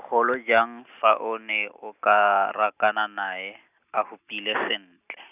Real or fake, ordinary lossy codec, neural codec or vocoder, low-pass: real; none; none; 3.6 kHz